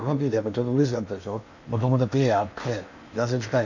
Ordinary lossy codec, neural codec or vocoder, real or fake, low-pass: none; codec, 16 kHz in and 24 kHz out, 0.8 kbps, FocalCodec, streaming, 65536 codes; fake; 7.2 kHz